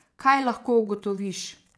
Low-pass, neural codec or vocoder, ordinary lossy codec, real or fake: none; vocoder, 22.05 kHz, 80 mel bands, WaveNeXt; none; fake